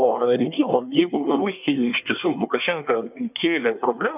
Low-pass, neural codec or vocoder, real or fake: 3.6 kHz; codec, 24 kHz, 1 kbps, SNAC; fake